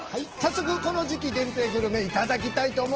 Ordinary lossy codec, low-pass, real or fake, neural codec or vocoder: Opus, 16 kbps; 7.2 kHz; real; none